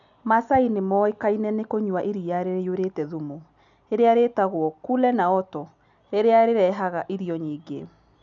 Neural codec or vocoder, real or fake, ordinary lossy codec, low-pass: none; real; none; 7.2 kHz